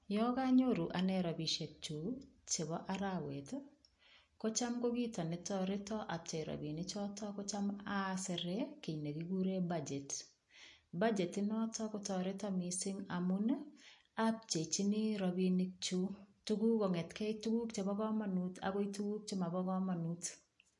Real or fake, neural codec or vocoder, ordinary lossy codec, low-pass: real; none; MP3, 48 kbps; 10.8 kHz